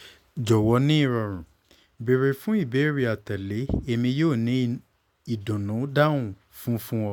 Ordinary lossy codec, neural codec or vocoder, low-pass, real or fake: none; none; 19.8 kHz; real